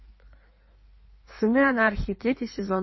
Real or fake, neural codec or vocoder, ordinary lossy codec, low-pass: fake; codec, 16 kHz in and 24 kHz out, 1.1 kbps, FireRedTTS-2 codec; MP3, 24 kbps; 7.2 kHz